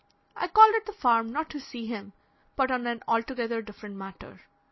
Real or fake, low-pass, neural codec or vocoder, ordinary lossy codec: real; 7.2 kHz; none; MP3, 24 kbps